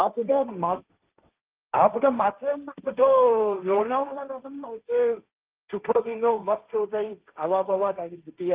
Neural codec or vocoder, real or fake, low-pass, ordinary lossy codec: codec, 16 kHz, 1.1 kbps, Voila-Tokenizer; fake; 3.6 kHz; Opus, 32 kbps